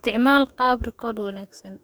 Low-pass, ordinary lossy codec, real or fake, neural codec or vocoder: none; none; fake; codec, 44.1 kHz, 2.6 kbps, DAC